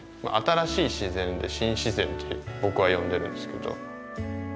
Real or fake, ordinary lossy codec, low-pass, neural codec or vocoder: real; none; none; none